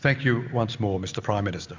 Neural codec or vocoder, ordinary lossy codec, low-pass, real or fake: none; MP3, 64 kbps; 7.2 kHz; real